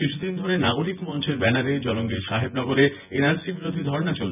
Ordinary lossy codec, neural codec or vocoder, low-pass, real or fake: none; vocoder, 24 kHz, 100 mel bands, Vocos; 3.6 kHz; fake